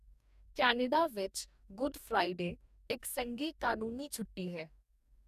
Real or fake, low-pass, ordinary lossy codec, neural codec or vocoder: fake; 14.4 kHz; none; codec, 44.1 kHz, 2.6 kbps, DAC